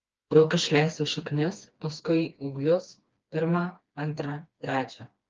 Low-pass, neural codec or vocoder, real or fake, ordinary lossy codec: 7.2 kHz; codec, 16 kHz, 2 kbps, FreqCodec, smaller model; fake; Opus, 24 kbps